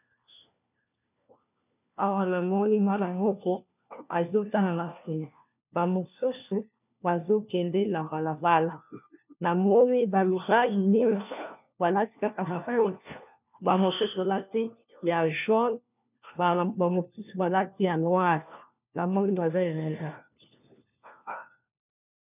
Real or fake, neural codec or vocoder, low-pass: fake; codec, 16 kHz, 1 kbps, FunCodec, trained on LibriTTS, 50 frames a second; 3.6 kHz